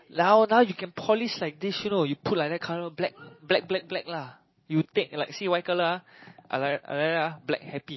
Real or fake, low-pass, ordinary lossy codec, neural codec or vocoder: real; 7.2 kHz; MP3, 24 kbps; none